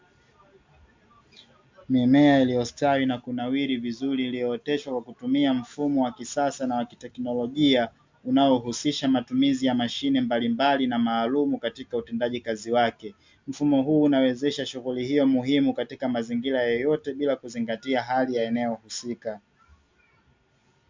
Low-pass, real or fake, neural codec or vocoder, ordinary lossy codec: 7.2 kHz; real; none; MP3, 64 kbps